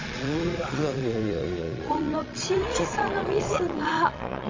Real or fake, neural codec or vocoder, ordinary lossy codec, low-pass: fake; vocoder, 22.05 kHz, 80 mel bands, Vocos; Opus, 32 kbps; 7.2 kHz